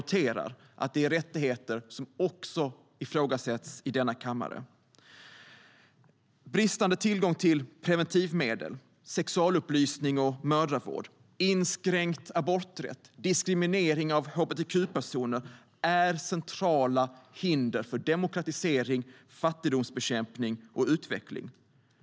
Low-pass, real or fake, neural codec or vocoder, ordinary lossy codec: none; real; none; none